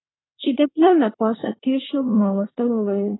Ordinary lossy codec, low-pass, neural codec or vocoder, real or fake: AAC, 16 kbps; 7.2 kHz; codec, 24 kHz, 1 kbps, SNAC; fake